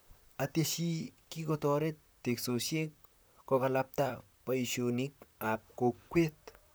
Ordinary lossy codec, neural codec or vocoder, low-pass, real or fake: none; vocoder, 44.1 kHz, 128 mel bands, Pupu-Vocoder; none; fake